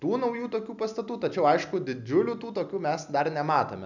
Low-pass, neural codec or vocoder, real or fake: 7.2 kHz; none; real